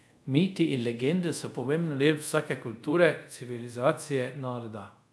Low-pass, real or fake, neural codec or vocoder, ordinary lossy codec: none; fake; codec, 24 kHz, 0.5 kbps, DualCodec; none